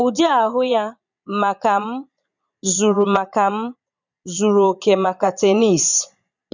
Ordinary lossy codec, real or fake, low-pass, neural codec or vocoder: none; fake; 7.2 kHz; vocoder, 22.05 kHz, 80 mel bands, Vocos